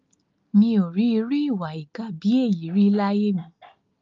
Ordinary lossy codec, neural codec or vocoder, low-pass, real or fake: Opus, 24 kbps; none; 7.2 kHz; real